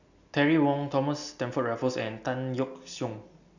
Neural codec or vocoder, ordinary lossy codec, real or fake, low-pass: none; none; real; 7.2 kHz